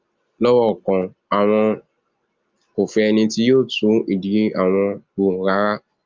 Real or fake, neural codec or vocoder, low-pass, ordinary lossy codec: real; none; 7.2 kHz; Opus, 32 kbps